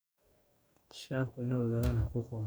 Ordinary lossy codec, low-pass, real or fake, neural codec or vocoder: none; none; fake; codec, 44.1 kHz, 2.6 kbps, DAC